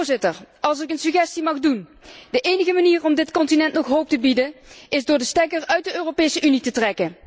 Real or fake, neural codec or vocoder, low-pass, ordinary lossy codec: real; none; none; none